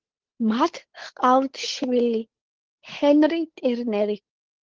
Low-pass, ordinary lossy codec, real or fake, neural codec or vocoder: 7.2 kHz; Opus, 16 kbps; fake; codec, 16 kHz, 8 kbps, FunCodec, trained on Chinese and English, 25 frames a second